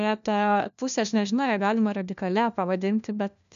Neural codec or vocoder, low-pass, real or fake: codec, 16 kHz, 1 kbps, FunCodec, trained on LibriTTS, 50 frames a second; 7.2 kHz; fake